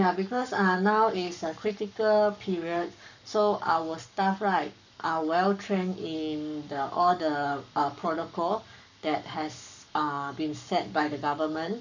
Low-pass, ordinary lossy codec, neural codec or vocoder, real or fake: 7.2 kHz; none; codec, 44.1 kHz, 7.8 kbps, Pupu-Codec; fake